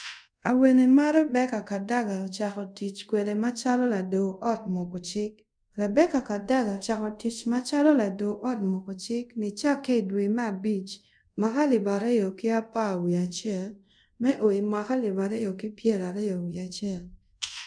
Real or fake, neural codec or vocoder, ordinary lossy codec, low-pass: fake; codec, 24 kHz, 0.5 kbps, DualCodec; none; 9.9 kHz